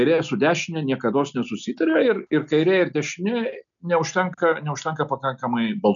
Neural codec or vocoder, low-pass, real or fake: none; 7.2 kHz; real